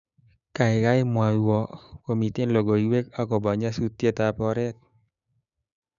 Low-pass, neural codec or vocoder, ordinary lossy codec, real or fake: 7.2 kHz; codec, 16 kHz, 6 kbps, DAC; none; fake